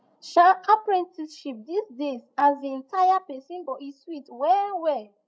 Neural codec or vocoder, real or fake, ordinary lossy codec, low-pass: codec, 16 kHz, 16 kbps, FreqCodec, larger model; fake; none; none